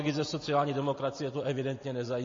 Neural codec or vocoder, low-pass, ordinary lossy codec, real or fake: none; 7.2 kHz; MP3, 32 kbps; real